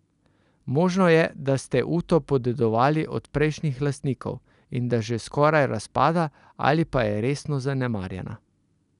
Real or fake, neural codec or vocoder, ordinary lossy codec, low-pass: real; none; none; 10.8 kHz